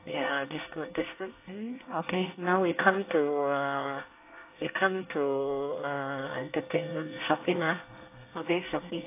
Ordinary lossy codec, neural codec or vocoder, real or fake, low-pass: AAC, 24 kbps; codec, 24 kHz, 1 kbps, SNAC; fake; 3.6 kHz